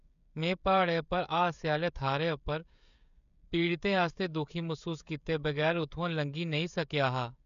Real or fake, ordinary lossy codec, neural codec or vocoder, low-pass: fake; none; codec, 16 kHz, 16 kbps, FreqCodec, smaller model; 7.2 kHz